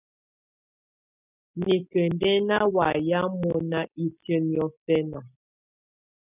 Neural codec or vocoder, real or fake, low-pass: none; real; 3.6 kHz